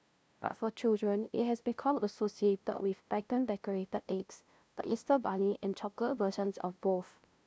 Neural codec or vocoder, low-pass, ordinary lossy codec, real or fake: codec, 16 kHz, 0.5 kbps, FunCodec, trained on LibriTTS, 25 frames a second; none; none; fake